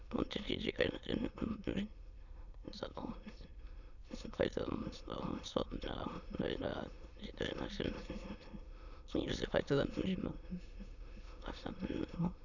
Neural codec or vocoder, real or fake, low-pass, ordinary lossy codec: autoencoder, 22.05 kHz, a latent of 192 numbers a frame, VITS, trained on many speakers; fake; 7.2 kHz; none